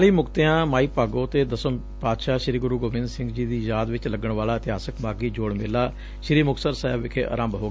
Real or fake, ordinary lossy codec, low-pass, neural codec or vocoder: real; none; none; none